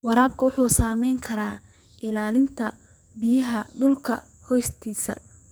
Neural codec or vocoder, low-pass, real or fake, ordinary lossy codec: codec, 44.1 kHz, 2.6 kbps, SNAC; none; fake; none